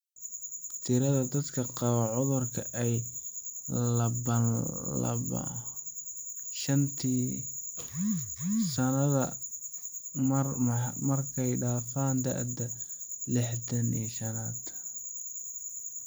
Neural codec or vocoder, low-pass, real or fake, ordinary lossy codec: none; none; real; none